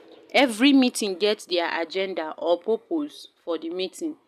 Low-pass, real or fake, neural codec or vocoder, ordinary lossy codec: 14.4 kHz; real; none; none